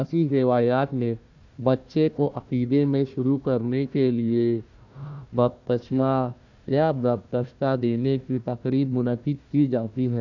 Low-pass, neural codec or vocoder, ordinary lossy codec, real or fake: 7.2 kHz; codec, 16 kHz, 1 kbps, FunCodec, trained on Chinese and English, 50 frames a second; none; fake